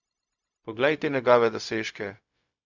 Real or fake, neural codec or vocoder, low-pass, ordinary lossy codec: fake; codec, 16 kHz, 0.4 kbps, LongCat-Audio-Codec; 7.2 kHz; none